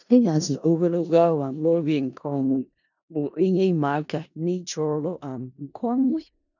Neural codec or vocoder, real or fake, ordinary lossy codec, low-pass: codec, 16 kHz in and 24 kHz out, 0.4 kbps, LongCat-Audio-Codec, four codebook decoder; fake; none; 7.2 kHz